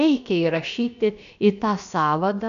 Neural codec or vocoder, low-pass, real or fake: codec, 16 kHz, about 1 kbps, DyCAST, with the encoder's durations; 7.2 kHz; fake